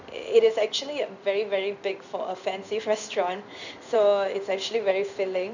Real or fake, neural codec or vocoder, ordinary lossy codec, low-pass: fake; codec, 16 kHz in and 24 kHz out, 1 kbps, XY-Tokenizer; none; 7.2 kHz